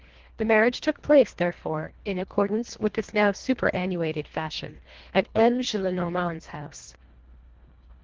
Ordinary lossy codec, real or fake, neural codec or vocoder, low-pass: Opus, 16 kbps; fake; codec, 24 kHz, 1.5 kbps, HILCodec; 7.2 kHz